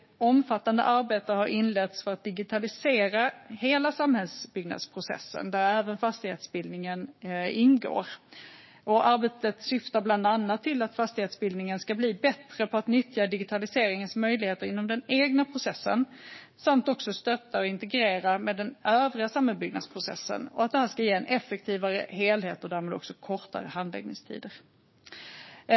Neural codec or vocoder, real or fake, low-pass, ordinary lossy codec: codec, 16 kHz, 6 kbps, DAC; fake; 7.2 kHz; MP3, 24 kbps